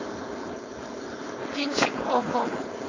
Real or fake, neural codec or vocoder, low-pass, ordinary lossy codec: fake; codec, 16 kHz, 4.8 kbps, FACodec; 7.2 kHz; AAC, 32 kbps